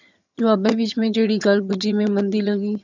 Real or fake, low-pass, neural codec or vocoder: fake; 7.2 kHz; vocoder, 22.05 kHz, 80 mel bands, HiFi-GAN